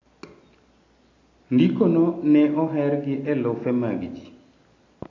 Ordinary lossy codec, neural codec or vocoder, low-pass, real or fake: AAC, 32 kbps; none; 7.2 kHz; real